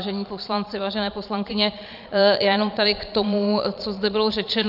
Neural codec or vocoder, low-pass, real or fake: vocoder, 22.05 kHz, 80 mel bands, Vocos; 5.4 kHz; fake